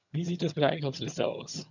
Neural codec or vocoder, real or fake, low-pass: vocoder, 22.05 kHz, 80 mel bands, HiFi-GAN; fake; 7.2 kHz